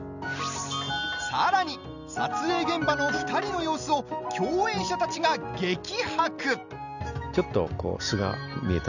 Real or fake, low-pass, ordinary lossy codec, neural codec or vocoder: real; 7.2 kHz; none; none